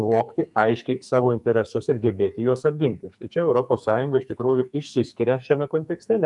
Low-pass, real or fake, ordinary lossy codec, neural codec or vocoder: 10.8 kHz; fake; MP3, 96 kbps; codec, 44.1 kHz, 2.6 kbps, SNAC